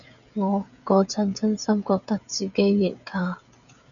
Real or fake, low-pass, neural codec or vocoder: fake; 7.2 kHz; codec, 16 kHz, 16 kbps, FreqCodec, smaller model